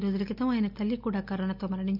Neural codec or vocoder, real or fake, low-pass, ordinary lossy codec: none; real; 5.4 kHz; none